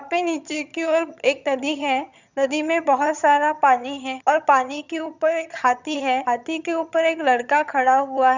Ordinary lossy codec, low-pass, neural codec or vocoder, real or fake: none; 7.2 kHz; vocoder, 22.05 kHz, 80 mel bands, HiFi-GAN; fake